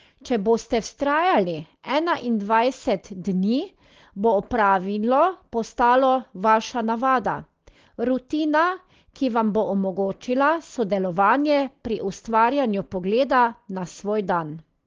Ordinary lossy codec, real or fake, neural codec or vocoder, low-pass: Opus, 16 kbps; real; none; 7.2 kHz